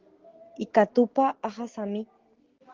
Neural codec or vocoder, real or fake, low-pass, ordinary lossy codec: none; real; 7.2 kHz; Opus, 16 kbps